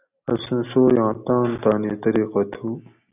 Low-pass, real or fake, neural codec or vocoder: 3.6 kHz; real; none